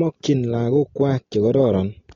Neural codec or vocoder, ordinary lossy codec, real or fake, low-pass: none; AAC, 32 kbps; real; 7.2 kHz